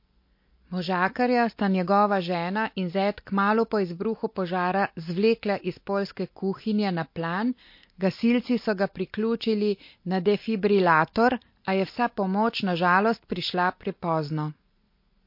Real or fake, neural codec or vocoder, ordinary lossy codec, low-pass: real; none; MP3, 32 kbps; 5.4 kHz